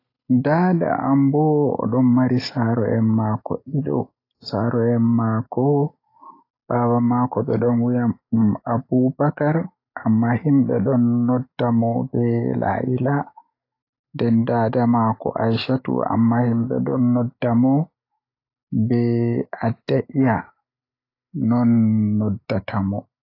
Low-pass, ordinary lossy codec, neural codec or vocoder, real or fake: 5.4 kHz; AAC, 32 kbps; none; real